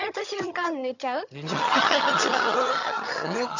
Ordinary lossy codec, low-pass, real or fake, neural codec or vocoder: none; 7.2 kHz; fake; vocoder, 22.05 kHz, 80 mel bands, HiFi-GAN